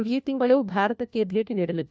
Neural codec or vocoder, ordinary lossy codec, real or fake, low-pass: codec, 16 kHz, 1 kbps, FunCodec, trained on LibriTTS, 50 frames a second; none; fake; none